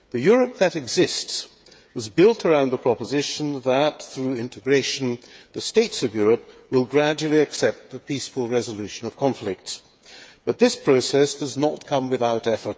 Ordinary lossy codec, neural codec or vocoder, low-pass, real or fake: none; codec, 16 kHz, 8 kbps, FreqCodec, smaller model; none; fake